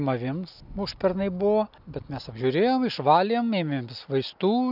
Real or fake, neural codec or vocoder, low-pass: real; none; 5.4 kHz